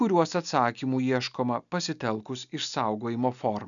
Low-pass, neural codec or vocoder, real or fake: 7.2 kHz; none; real